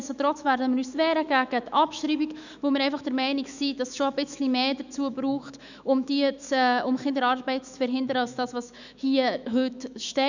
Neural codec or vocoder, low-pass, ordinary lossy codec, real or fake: autoencoder, 48 kHz, 128 numbers a frame, DAC-VAE, trained on Japanese speech; 7.2 kHz; none; fake